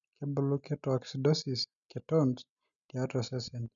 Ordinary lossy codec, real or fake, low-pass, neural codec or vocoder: none; real; 7.2 kHz; none